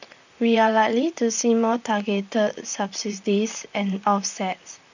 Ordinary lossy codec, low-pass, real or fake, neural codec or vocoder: none; 7.2 kHz; fake; vocoder, 44.1 kHz, 128 mel bands, Pupu-Vocoder